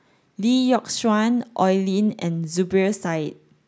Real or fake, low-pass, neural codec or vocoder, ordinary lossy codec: real; none; none; none